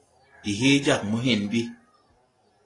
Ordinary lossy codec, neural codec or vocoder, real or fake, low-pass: AAC, 32 kbps; none; real; 10.8 kHz